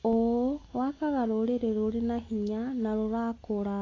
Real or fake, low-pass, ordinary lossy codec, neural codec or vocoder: real; 7.2 kHz; AAC, 32 kbps; none